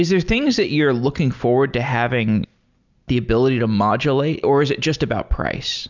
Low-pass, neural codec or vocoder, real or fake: 7.2 kHz; none; real